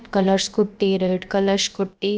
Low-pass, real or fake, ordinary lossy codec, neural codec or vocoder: none; fake; none; codec, 16 kHz, about 1 kbps, DyCAST, with the encoder's durations